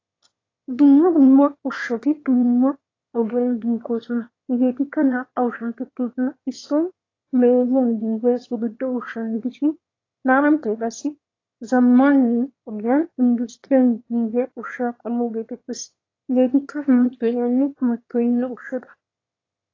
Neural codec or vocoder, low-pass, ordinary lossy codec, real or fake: autoencoder, 22.05 kHz, a latent of 192 numbers a frame, VITS, trained on one speaker; 7.2 kHz; AAC, 32 kbps; fake